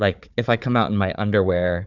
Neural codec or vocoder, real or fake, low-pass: codec, 16 kHz, 4 kbps, FunCodec, trained on Chinese and English, 50 frames a second; fake; 7.2 kHz